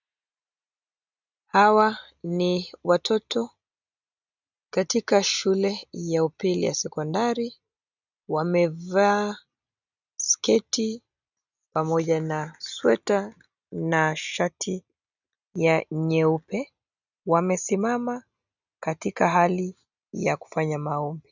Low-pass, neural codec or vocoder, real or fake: 7.2 kHz; none; real